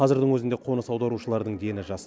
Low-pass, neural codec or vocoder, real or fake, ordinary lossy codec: none; none; real; none